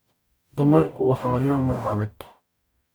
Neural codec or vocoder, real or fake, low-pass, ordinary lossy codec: codec, 44.1 kHz, 0.9 kbps, DAC; fake; none; none